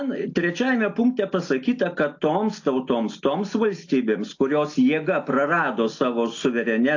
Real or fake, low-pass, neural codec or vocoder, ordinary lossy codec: real; 7.2 kHz; none; AAC, 48 kbps